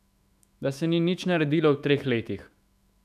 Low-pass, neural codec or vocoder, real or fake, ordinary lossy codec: 14.4 kHz; autoencoder, 48 kHz, 128 numbers a frame, DAC-VAE, trained on Japanese speech; fake; none